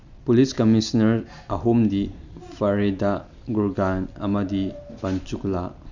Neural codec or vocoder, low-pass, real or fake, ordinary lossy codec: none; 7.2 kHz; real; none